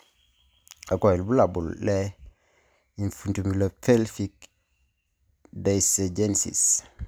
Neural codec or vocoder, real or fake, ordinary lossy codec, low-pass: none; real; none; none